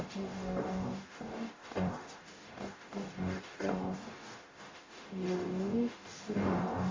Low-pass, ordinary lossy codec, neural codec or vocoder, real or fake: 7.2 kHz; MP3, 32 kbps; codec, 44.1 kHz, 0.9 kbps, DAC; fake